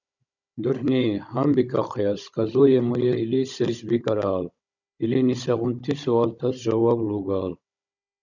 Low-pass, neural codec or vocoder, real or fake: 7.2 kHz; codec, 16 kHz, 16 kbps, FunCodec, trained on Chinese and English, 50 frames a second; fake